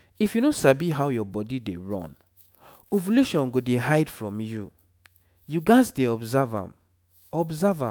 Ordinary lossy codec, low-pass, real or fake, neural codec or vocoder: none; none; fake; autoencoder, 48 kHz, 128 numbers a frame, DAC-VAE, trained on Japanese speech